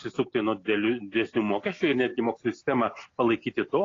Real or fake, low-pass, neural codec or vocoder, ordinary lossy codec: real; 7.2 kHz; none; AAC, 32 kbps